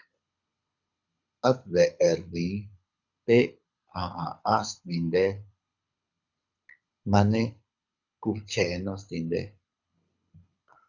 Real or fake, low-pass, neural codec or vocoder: fake; 7.2 kHz; codec, 24 kHz, 6 kbps, HILCodec